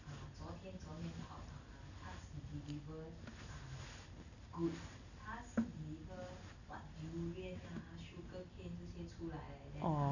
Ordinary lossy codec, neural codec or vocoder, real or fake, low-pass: none; none; real; 7.2 kHz